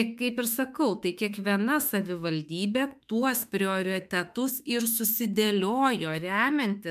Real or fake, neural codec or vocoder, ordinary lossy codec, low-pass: fake; autoencoder, 48 kHz, 32 numbers a frame, DAC-VAE, trained on Japanese speech; MP3, 96 kbps; 14.4 kHz